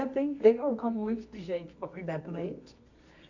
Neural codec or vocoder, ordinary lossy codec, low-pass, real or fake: codec, 24 kHz, 0.9 kbps, WavTokenizer, medium music audio release; none; 7.2 kHz; fake